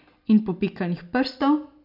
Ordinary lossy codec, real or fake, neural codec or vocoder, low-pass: none; real; none; 5.4 kHz